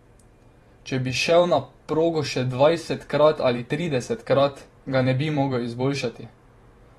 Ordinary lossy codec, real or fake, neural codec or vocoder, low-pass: AAC, 32 kbps; fake; vocoder, 44.1 kHz, 128 mel bands every 512 samples, BigVGAN v2; 19.8 kHz